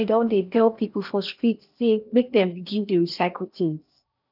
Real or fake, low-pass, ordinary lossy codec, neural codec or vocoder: fake; 5.4 kHz; none; codec, 16 kHz in and 24 kHz out, 0.8 kbps, FocalCodec, streaming, 65536 codes